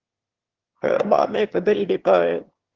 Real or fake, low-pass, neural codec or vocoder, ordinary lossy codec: fake; 7.2 kHz; autoencoder, 22.05 kHz, a latent of 192 numbers a frame, VITS, trained on one speaker; Opus, 16 kbps